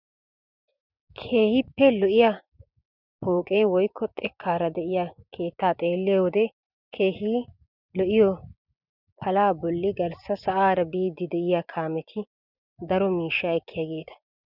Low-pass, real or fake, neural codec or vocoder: 5.4 kHz; fake; vocoder, 24 kHz, 100 mel bands, Vocos